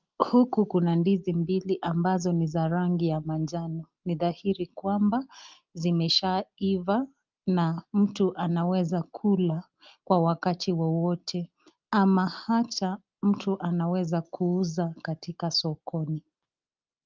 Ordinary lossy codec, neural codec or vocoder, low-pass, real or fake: Opus, 32 kbps; none; 7.2 kHz; real